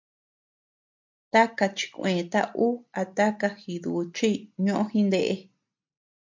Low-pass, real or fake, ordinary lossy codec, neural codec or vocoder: 7.2 kHz; real; MP3, 64 kbps; none